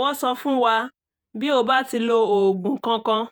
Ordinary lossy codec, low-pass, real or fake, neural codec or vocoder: none; none; fake; vocoder, 48 kHz, 128 mel bands, Vocos